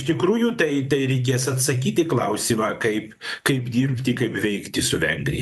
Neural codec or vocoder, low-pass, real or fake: vocoder, 44.1 kHz, 128 mel bands, Pupu-Vocoder; 14.4 kHz; fake